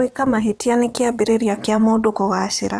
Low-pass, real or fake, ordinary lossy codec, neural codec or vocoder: none; fake; none; vocoder, 22.05 kHz, 80 mel bands, WaveNeXt